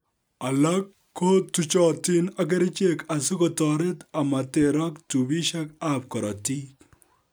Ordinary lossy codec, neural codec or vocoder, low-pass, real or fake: none; none; none; real